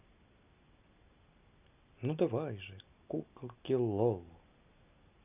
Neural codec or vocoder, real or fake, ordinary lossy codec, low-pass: none; real; none; 3.6 kHz